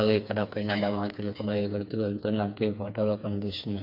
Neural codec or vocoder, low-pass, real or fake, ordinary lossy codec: codec, 16 kHz, 4 kbps, FreqCodec, smaller model; 5.4 kHz; fake; MP3, 48 kbps